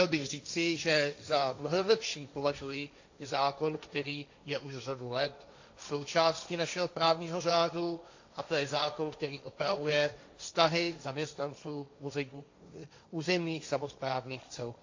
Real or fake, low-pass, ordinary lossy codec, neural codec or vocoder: fake; 7.2 kHz; AAC, 48 kbps; codec, 16 kHz, 1.1 kbps, Voila-Tokenizer